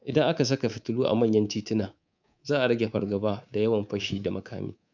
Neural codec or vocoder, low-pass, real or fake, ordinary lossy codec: codec, 24 kHz, 3.1 kbps, DualCodec; 7.2 kHz; fake; none